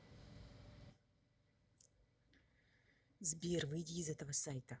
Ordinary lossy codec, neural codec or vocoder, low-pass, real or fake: none; none; none; real